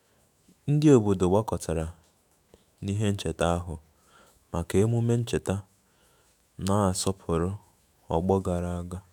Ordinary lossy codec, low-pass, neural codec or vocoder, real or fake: none; 19.8 kHz; autoencoder, 48 kHz, 128 numbers a frame, DAC-VAE, trained on Japanese speech; fake